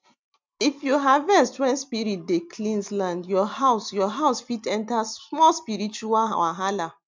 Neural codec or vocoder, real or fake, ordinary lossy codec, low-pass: none; real; MP3, 64 kbps; 7.2 kHz